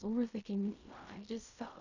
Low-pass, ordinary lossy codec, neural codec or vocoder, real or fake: 7.2 kHz; none; codec, 16 kHz in and 24 kHz out, 0.4 kbps, LongCat-Audio-Codec, fine tuned four codebook decoder; fake